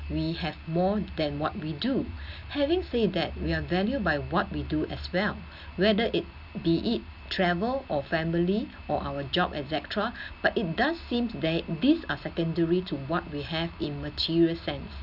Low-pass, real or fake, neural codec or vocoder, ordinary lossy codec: 5.4 kHz; real; none; none